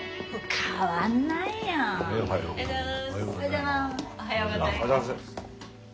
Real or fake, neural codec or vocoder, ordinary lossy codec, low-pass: real; none; none; none